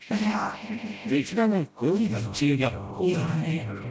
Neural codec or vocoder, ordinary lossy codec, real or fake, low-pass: codec, 16 kHz, 0.5 kbps, FreqCodec, smaller model; none; fake; none